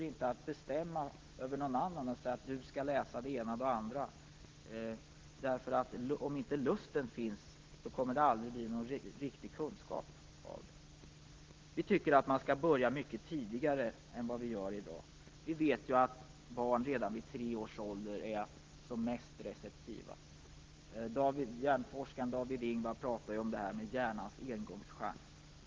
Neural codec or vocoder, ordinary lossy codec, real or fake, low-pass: none; Opus, 16 kbps; real; 7.2 kHz